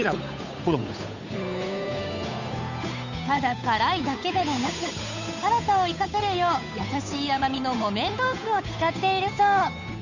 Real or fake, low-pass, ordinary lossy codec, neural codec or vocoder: fake; 7.2 kHz; none; codec, 16 kHz, 8 kbps, FunCodec, trained on Chinese and English, 25 frames a second